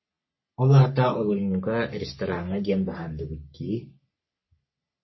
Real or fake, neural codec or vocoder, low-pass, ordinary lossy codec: fake; codec, 44.1 kHz, 3.4 kbps, Pupu-Codec; 7.2 kHz; MP3, 24 kbps